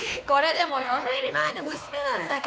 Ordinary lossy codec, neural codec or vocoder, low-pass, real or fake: none; codec, 16 kHz, 2 kbps, X-Codec, WavLM features, trained on Multilingual LibriSpeech; none; fake